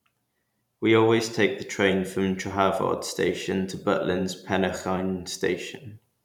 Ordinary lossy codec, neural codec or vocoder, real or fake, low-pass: none; vocoder, 44.1 kHz, 128 mel bands every 512 samples, BigVGAN v2; fake; 19.8 kHz